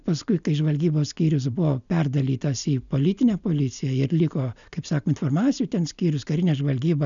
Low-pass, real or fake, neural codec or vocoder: 7.2 kHz; real; none